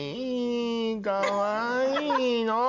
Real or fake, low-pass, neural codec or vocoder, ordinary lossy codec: real; 7.2 kHz; none; Opus, 64 kbps